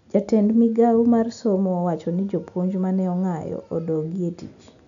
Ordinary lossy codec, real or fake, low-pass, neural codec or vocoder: none; real; 7.2 kHz; none